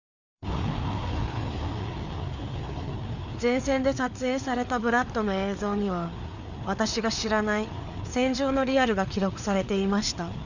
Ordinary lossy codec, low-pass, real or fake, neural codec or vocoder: none; 7.2 kHz; fake; codec, 16 kHz, 4 kbps, FreqCodec, larger model